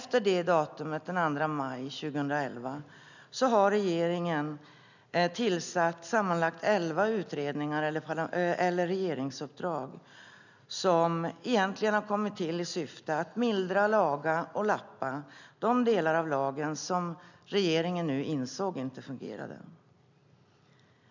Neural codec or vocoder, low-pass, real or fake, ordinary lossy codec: none; 7.2 kHz; real; none